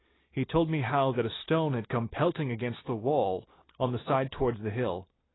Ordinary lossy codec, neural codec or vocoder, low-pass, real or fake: AAC, 16 kbps; none; 7.2 kHz; real